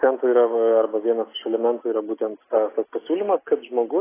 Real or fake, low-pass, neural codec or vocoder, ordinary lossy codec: real; 3.6 kHz; none; AAC, 16 kbps